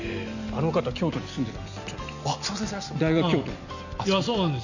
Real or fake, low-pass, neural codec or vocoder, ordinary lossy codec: real; 7.2 kHz; none; none